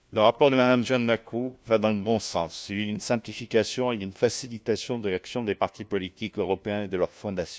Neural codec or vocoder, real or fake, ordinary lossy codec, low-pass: codec, 16 kHz, 1 kbps, FunCodec, trained on LibriTTS, 50 frames a second; fake; none; none